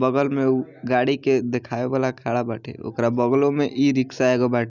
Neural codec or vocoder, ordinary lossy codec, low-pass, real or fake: none; none; 7.2 kHz; real